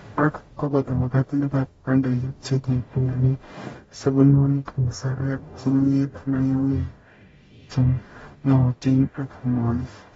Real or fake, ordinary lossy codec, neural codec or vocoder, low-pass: fake; AAC, 24 kbps; codec, 44.1 kHz, 0.9 kbps, DAC; 19.8 kHz